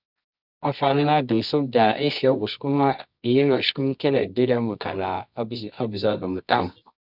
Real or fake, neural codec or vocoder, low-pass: fake; codec, 24 kHz, 0.9 kbps, WavTokenizer, medium music audio release; 5.4 kHz